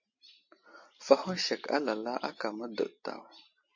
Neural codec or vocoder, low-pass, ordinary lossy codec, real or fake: none; 7.2 kHz; MP3, 32 kbps; real